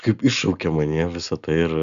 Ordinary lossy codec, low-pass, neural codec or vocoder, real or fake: Opus, 64 kbps; 7.2 kHz; none; real